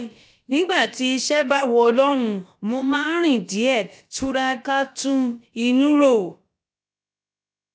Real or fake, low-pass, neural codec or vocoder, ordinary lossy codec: fake; none; codec, 16 kHz, about 1 kbps, DyCAST, with the encoder's durations; none